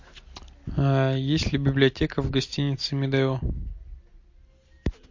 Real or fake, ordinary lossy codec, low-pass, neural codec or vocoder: real; MP3, 48 kbps; 7.2 kHz; none